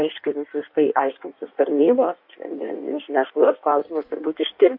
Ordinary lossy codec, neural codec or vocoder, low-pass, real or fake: MP3, 48 kbps; codec, 16 kHz in and 24 kHz out, 1.1 kbps, FireRedTTS-2 codec; 5.4 kHz; fake